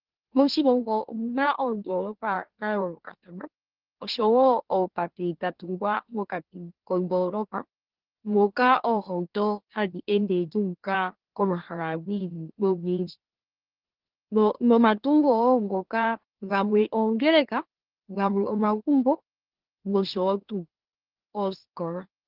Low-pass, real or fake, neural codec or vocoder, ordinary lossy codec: 5.4 kHz; fake; autoencoder, 44.1 kHz, a latent of 192 numbers a frame, MeloTTS; Opus, 16 kbps